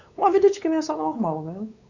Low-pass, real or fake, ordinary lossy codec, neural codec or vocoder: 7.2 kHz; fake; Opus, 64 kbps; codec, 16 kHz, 4 kbps, X-Codec, WavLM features, trained on Multilingual LibriSpeech